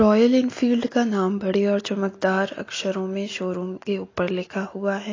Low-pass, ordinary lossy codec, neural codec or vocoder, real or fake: 7.2 kHz; AAC, 32 kbps; none; real